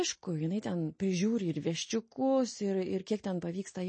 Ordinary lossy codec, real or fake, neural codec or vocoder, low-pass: MP3, 32 kbps; real; none; 9.9 kHz